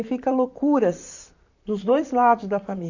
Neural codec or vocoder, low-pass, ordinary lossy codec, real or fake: vocoder, 44.1 kHz, 128 mel bands, Pupu-Vocoder; 7.2 kHz; none; fake